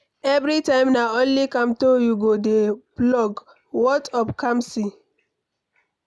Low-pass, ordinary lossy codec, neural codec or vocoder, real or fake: none; none; none; real